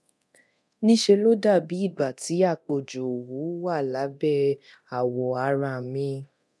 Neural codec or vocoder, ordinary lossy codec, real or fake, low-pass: codec, 24 kHz, 0.9 kbps, DualCodec; none; fake; none